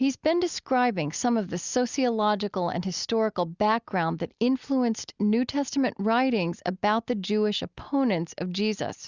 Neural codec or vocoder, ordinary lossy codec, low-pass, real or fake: none; Opus, 64 kbps; 7.2 kHz; real